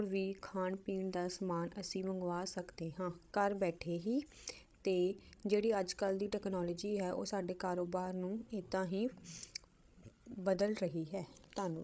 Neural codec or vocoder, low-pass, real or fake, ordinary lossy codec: codec, 16 kHz, 16 kbps, FreqCodec, larger model; none; fake; none